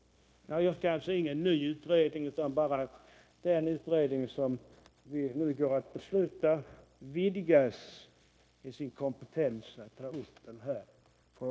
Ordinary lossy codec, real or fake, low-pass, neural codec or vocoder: none; fake; none; codec, 16 kHz, 0.9 kbps, LongCat-Audio-Codec